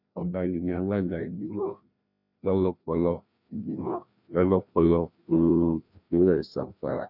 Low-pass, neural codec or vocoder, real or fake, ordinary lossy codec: 5.4 kHz; codec, 16 kHz, 1 kbps, FreqCodec, larger model; fake; none